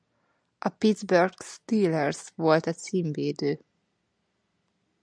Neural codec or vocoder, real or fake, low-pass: none; real; 9.9 kHz